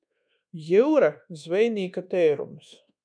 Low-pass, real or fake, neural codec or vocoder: 9.9 kHz; fake; codec, 24 kHz, 1.2 kbps, DualCodec